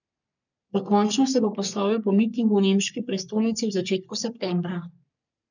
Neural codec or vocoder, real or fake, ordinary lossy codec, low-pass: codec, 44.1 kHz, 3.4 kbps, Pupu-Codec; fake; none; 7.2 kHz